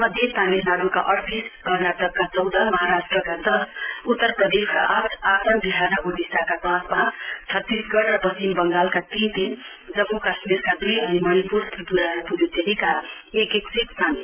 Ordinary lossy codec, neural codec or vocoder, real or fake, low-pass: Opus, 24 kbps; none; real; 3.6 kHz